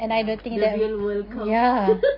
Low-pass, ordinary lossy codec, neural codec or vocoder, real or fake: 5.4 kHz; none; none; real